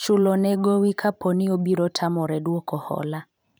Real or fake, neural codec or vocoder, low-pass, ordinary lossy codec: fake; vocoder, 44.1 kHz, 128 mel bands every 512 samples, BigVGAN v2; none; none